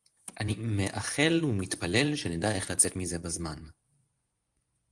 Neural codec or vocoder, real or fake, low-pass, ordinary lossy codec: vocoder, 24 kHz, 100 mel bands, Vocos; fake; 10.8 kHz; Opus, 24 kbps